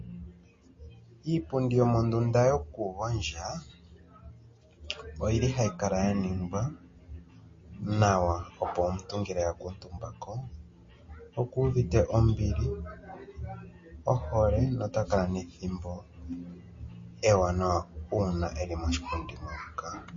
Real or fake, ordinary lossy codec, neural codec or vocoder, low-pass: real; MP3, 32 kbps; none; 7.2 kHz